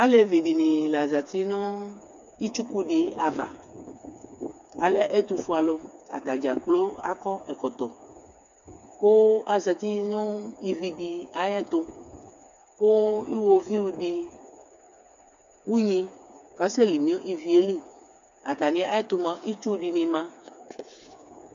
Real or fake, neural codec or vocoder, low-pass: fake; codec, 16 kHz, 4 kbps, FreqCodec, smaller model; 7.2 kHz